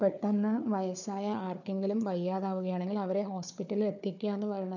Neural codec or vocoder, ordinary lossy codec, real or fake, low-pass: codec, 16 kHz, 4 kbps, FunCodec, trained on Chinese and English, 50 frames a second; none; fake; 7.2 kHz